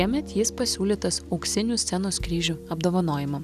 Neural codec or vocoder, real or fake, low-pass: none; real; 14.4 kHz